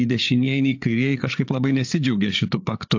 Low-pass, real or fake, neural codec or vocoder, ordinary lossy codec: 7.2 kHz; fake; codec, 16 kHz, 8 kbps, FreqCodec, larger model; AAC, 48 kbps